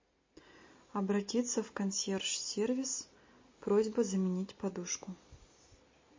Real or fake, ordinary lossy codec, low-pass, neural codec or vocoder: real; MP3, 32 kbps; 7.2 kHz; none